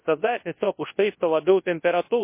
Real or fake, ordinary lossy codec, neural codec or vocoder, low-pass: fake; MP3, 24 kbps; codec, 24 kHz, 0.9 kbps, WavTokenizer, large speech release; 3.6 kHz